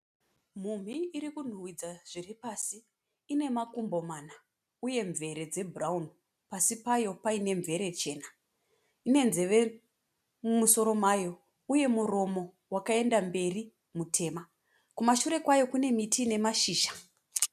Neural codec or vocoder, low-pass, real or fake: none; 14.4 kHz; real